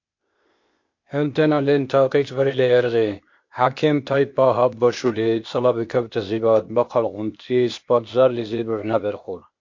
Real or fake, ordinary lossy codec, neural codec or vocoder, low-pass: fake; MP3, 48 kbps; codec, 16 kHz, 0.8 kbps, ZipCodec; 7.2 kHz